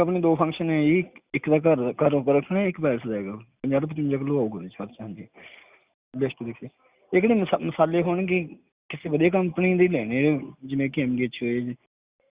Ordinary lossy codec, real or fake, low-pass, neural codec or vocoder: Opus, 64 kbps; real; 3.6 kHz; none